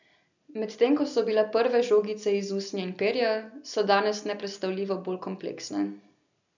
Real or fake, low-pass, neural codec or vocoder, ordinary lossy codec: real; 7.2 kHz; none; none